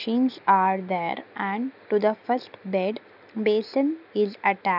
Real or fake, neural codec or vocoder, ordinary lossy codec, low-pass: real; none; none; 5.4 kHz